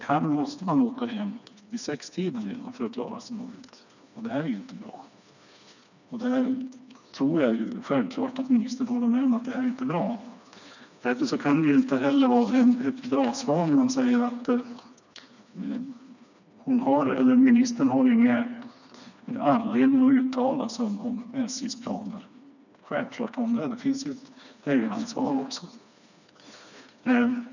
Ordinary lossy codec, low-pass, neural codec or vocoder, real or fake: none; 7.2 kHz; codec, 16 kHz, 2 kbps, FreqCodec, smaller model; fake